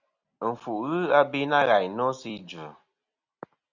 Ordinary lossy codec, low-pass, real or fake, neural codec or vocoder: Opus, 64 kbps; 7.2 kHz; real; none